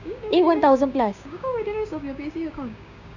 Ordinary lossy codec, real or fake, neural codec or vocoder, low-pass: none; fake; codec, 16 kHz, 6 kbps, DAC; 7.2 kHz